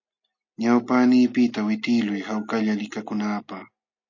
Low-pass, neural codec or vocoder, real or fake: 7.2 kHz; none; real